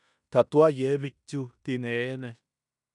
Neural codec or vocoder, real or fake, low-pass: codec, 16 kHz in and 24 kHz out, 0.9 kbps, LongCat-Audio-Codec, fine tuned four codebook decoder; fake; 10.8 kHz